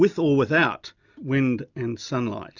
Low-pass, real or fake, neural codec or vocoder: 7.2 kHz; real; none